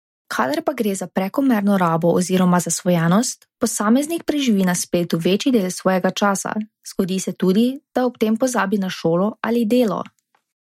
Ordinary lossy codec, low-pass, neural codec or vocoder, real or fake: MP3, 64 kbps; 19.8 kHz; none; real